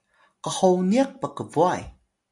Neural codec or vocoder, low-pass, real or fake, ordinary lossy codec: none; 10.8 kHz; real; AAC, 64 kbps